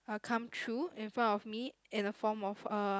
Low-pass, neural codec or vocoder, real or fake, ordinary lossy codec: none; none; real; none